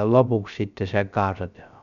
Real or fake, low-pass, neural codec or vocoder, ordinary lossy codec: fake; 7.2 kHz; codec, 16 kHz, 0.3 kbps, FocalCodec; MP3, 96 kbps